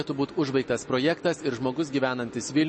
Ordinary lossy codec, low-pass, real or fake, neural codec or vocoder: MP3, 32 kbps; 10.8 kHz; real; none